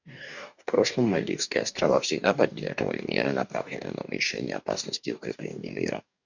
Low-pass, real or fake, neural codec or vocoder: 7.2 kHz; fake; codec, 44.1 kHz, 2.6 kbps, DAC